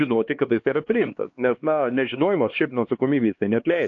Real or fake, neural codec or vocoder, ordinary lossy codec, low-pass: fake; codec, 16 kHz, 2 kbps, X-Codec, HuBERT features, trained on LibriSpeech; AAC, 48 kbps; 7.2 kHz